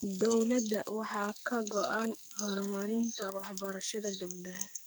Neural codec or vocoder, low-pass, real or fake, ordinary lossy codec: codec, 44.1 kHz, 2.6 kbps, SNAC; none; fake; none